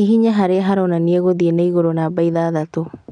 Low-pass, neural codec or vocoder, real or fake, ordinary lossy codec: 9.9 kHz; none; real; none